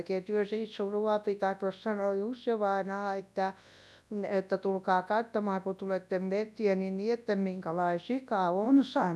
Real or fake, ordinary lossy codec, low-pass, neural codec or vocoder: fake; none; none; codec, 24 kHz, 0.9 kbps, WavTokenizer, large speech release